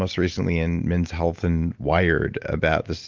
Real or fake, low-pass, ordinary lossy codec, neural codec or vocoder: real; 7.2 kHz; Opus, 24 kbps; none